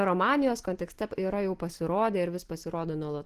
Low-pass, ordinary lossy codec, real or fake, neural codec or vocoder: 14.4 kHz; Opus, 16 kbps; real; none